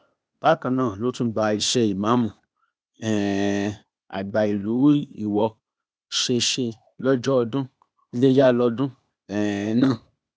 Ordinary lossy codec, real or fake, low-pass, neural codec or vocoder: none; fake; none; codec, 16 kHz, 0.8 kbps, ZipCodec